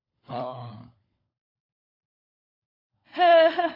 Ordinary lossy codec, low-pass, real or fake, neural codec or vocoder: AAC, 24 kbps; 5.4 kHz; fake; codec, 16 kHz, 16 kbps, FunCodec, trained on LibriTTS, 50 frames a second